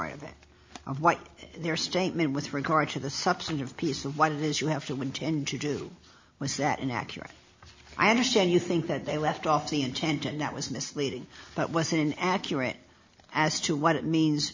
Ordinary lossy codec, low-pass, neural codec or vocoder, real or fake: MP3, 64 kbps; 7.2 kHz; none; real